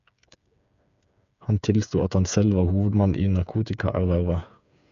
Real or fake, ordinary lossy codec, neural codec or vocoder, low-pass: fake; none; codec, 16 kHz, 8 kbps, FreqCodec, smaller model; 7.2 kHz